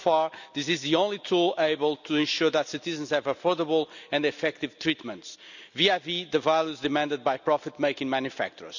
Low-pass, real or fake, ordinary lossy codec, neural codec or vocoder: 7.2 kHz; real; none; none